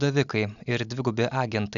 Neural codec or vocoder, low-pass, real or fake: none; 7.2 kHz; real